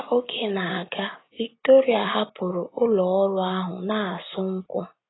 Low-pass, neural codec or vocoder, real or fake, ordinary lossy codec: 7.2 kHz; none; real; AAC, 16 kbps